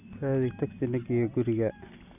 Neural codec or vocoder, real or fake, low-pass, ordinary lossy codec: none; real; 3.6 kHz; none